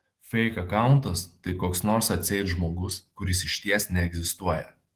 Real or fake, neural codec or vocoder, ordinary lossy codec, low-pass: fake; autoencoder, 48 kHz, 128 numbers a frame, DAC-VAE, trained on Japanese speech; Opus, 16 kbps; 14.4 kHz